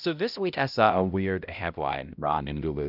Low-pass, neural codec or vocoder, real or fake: 5.4 kHz; codec, 16 kHz, 0.5 kbps, X-Codec, HuBERT features, trained on balanced general audio; fake